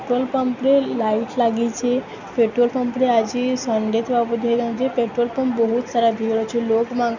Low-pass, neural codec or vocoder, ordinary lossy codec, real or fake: 7.2 kHz; none; none; real